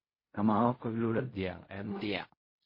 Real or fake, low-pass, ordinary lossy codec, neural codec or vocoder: fake; 5.4 kHz; MP3, 24 kbps; codec, 16 kHz in and 24 kHz out, 0.4 kbps, LongCat-Audio-Codec, fine tuned four codebook decoder